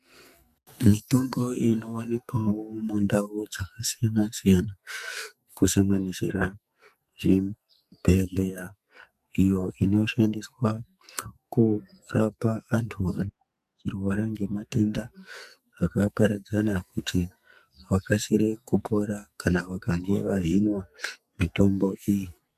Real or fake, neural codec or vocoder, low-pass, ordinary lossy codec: fake; codec, 44.1 kHz, 2.6 kbps, SNAC; 14.4 kHz; MP3, 96 kbps